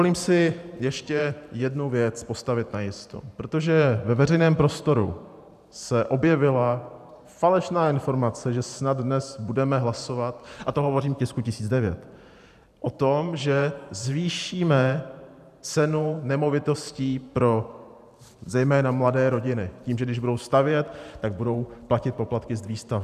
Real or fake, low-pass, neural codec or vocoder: fake; 14.4 kHz; vocoder, 44.1 kHz, 128 mel bands every 512 samples, BigVGAN v2